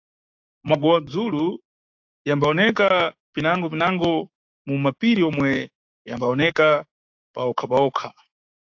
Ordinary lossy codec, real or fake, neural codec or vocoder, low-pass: AAC, 48 kbps; fake; codec, 16 kHz, 6 kbps, DAC; 7.2 kHz